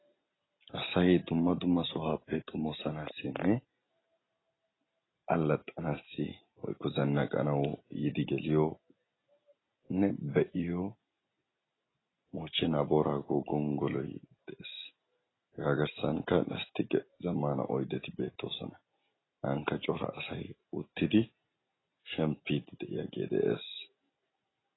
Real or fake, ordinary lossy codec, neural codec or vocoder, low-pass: real; AAC, 16 kbps; none; 7.2 kHz